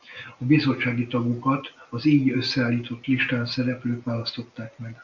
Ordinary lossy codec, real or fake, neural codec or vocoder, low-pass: MP3, 48 kbps; real; none; 7.2 kHz